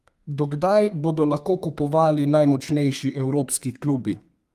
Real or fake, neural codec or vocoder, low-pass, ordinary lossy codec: fake; codec, 32 kHz, 1.9 kbps, SNAC; 14.4 kHz; Opus, 32 kbps